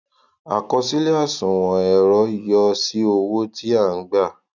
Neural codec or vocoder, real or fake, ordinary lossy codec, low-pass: none; real; none; 7.2 kHz